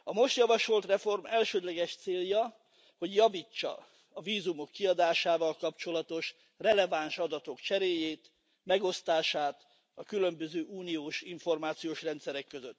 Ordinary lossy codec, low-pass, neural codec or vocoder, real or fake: none; none; none; real